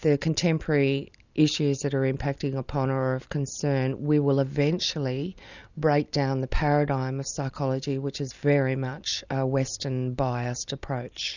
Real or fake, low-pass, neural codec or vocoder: real; 7.2 kHz; none